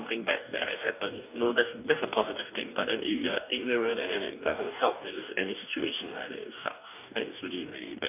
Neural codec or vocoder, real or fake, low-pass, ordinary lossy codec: codec, 44.1 kHz, 2.6 kbps, DAC; fake; 3.6 kHz; none